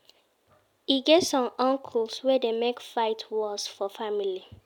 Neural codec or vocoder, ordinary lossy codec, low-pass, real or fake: none; none; 19.8 kHz; real